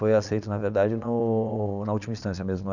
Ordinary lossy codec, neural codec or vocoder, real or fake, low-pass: none; vocoder, 22.05 kHz, 80 mel bands, Vocos; fake; 7.2 kHz